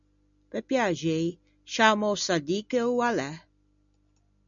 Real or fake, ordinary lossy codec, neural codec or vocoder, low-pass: real; MP3, 96 kbps; none; 7.2 kHz